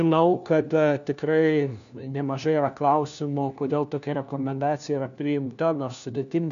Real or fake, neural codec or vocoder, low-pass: fake; codec, 16 kHz, 1 kbps, FunCodec, trained on LibriTTS, 50 frames a second; 7.2 kHz